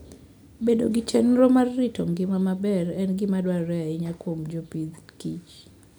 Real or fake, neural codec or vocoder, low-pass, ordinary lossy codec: real; none; 19.8 kHz; none